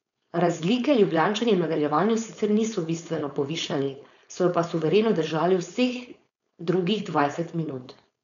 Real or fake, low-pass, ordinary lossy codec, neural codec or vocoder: fake; 7.2 kHz; none; codec, 16 kHz, 4.8 kbps, FACodec